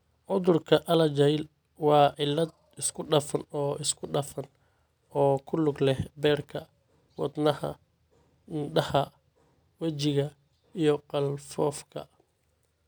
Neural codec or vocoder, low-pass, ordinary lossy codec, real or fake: none; none; none; real